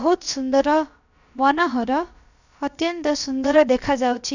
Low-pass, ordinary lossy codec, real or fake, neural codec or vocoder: 7.2 kHz; none; fake; codec, 16 kHz, about 1 kbps, DyCAST, with the encoder's durations